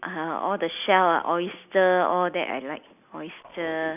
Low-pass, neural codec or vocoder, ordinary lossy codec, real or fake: 3.6 kHz; none; none; real